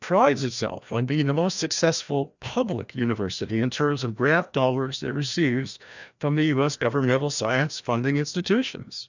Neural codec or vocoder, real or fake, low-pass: codec, 16 kHz, 1 kbps, FreqCodec, larger model; fake; 7.2 kHz